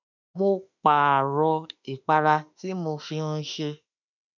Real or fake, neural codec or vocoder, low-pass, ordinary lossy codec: fake; autoencoder, 48 kHz, 32 numbers a frame, DAC-VAE, trained on Japanese speech; 7.2 kHz; none